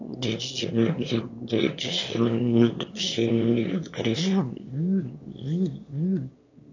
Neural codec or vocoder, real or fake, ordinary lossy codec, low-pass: autoencoder, 22.05 kHz, a latent of 192 numbers a frame, VITS, trained on one speaker; fake; AAC, 48 kbps; 7.2 kHz